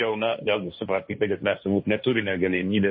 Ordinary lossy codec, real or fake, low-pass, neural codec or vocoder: MP3, 24 kbps; fake; 7.2 kHz; codec, 16 kHz, 1.1 kbps, Voila-Tokenizer